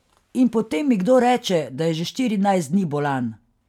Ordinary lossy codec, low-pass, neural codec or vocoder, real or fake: none; 19.8 kHz; none; real